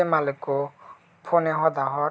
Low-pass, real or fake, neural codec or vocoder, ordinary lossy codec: none; real; none; none